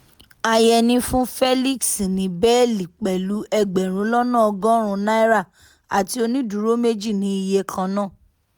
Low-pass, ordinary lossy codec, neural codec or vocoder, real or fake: none; none; none; real